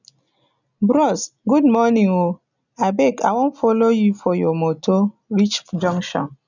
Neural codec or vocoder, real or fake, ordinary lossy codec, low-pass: none; real; none; 7.2 kHz